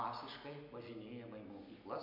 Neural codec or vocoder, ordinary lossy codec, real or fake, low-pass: none; MP3, 48 kbps; real; 5.4 kHz